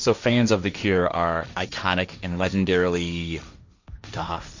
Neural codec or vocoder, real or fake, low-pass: codec, 16 kHz, 1.1 kbps, Voila-Tokenizer; fake; 7.2 kHz